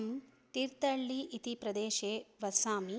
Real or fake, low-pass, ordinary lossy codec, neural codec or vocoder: real; none; none; none